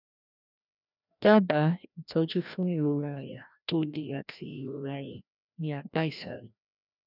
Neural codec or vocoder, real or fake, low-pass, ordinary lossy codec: codec, 16 kHz, 1 kbps, FreqCodec, larger model; fake; 5.4 kHz; none